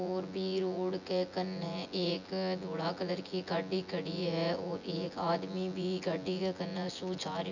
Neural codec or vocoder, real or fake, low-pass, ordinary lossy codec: vocoder, 24 kHz, 100 mel bands, Vocos; fake; 7.2 kHz; none